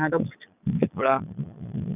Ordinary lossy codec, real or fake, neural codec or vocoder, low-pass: none; fake; vocoder, 22.05 kHz, 80 mel bands, Vocos; 3.6 kHz